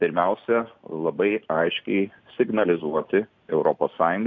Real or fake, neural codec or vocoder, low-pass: real; none; 7.2 kHz